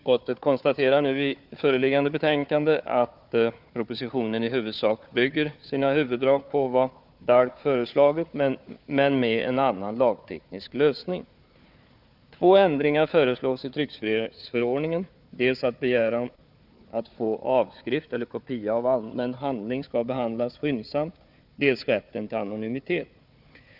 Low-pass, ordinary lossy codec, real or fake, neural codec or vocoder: 5.4 kHz; none; fake; codec, 16 kHz, 4 kbps, FunCodec, trained on Chinese and English, 50 frames a second